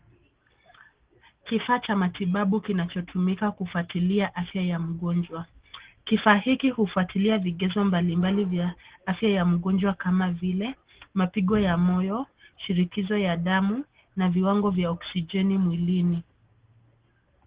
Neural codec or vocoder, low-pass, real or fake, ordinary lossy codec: none; 3.6 kHz; real; Opus, 16 kbps